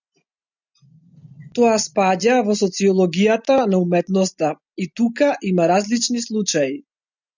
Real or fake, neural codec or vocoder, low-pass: real; none; 7.2 kHz